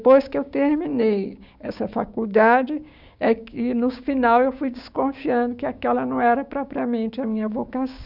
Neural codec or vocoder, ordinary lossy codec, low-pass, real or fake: codec, 16 kHz, 6 kbps, DAC; none; 5.4 kHz; fake